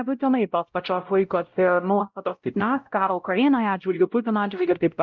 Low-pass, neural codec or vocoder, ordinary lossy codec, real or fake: 7.2 kHz; codec, 16 kHz, 0.5 kbps, X-Codec, WavLM features, trained on Multilingual LibriSpeech; Opus, 32 kbps; fake